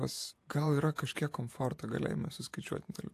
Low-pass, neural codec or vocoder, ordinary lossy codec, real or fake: 14.4 kHz; vocoder, 48 kHz, 128 mel bands, Vocos; AAC, 64 kbps; fake